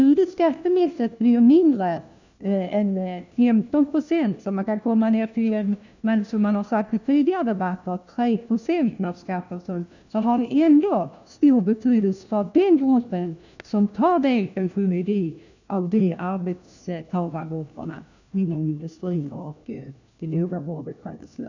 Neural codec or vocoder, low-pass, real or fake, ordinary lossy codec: codec, 16 kHz, 1 kbps, FunCodec, trained on LibriTTS, 50 frames a second; 7.2 kHz; fake; none